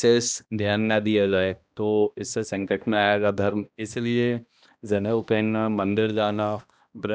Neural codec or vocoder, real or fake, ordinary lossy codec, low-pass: codec, 16 kHz, 1 kbps, X-Codec, HuBERT features, trained on balanced general audio; fake; none; none